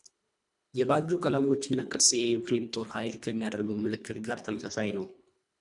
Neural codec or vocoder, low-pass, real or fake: codec, 24 kHz, 1.5 kbps, HILCodec; 10.8 kHz; fake